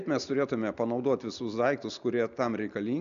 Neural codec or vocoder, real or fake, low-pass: none; real; 7.2 kHz